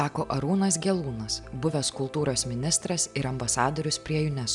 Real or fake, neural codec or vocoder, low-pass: real; none; 10.8 kHz